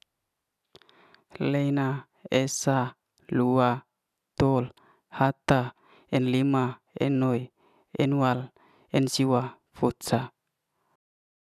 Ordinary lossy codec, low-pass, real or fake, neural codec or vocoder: none; 14.4 kHz; real; none